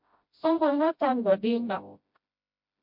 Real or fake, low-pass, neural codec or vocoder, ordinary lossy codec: fake; 5.4 kHz; codec, 16 kHz, 0.5 kbps, FreqCodec, smaller model; MP3, 48 kbps